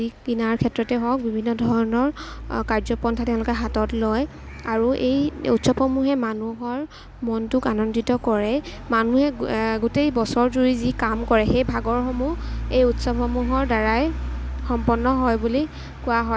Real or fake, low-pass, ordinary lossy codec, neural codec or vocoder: real; none; none; none